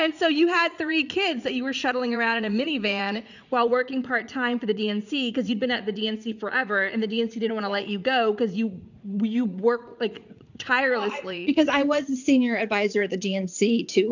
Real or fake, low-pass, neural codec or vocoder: fake; 7.2 kHz; vocoder, 44.1 kHz, 80 mel bands, Vocos